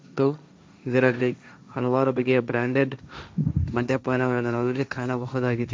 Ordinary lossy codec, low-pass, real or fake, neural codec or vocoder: none; none; fake; codec, 16 kHz, 1.1 kbps, Voila-Tokenizer